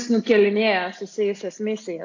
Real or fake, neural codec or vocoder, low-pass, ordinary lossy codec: real; none; 7.2 kHz; AAC, 48 kbps